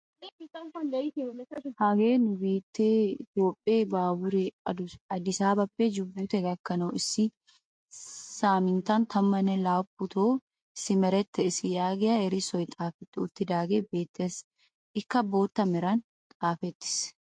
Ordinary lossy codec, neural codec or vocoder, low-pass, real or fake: MP3, 48 kbps; none; 9.9 kHz; real